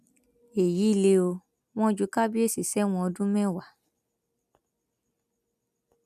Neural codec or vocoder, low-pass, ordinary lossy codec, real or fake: none; 14.4 kHz; none; real